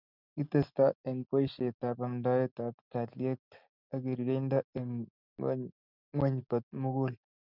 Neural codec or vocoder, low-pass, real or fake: none; 5.4 kHz; real